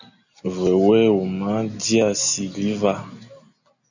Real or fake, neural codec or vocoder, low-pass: real; none; 7.2 kHz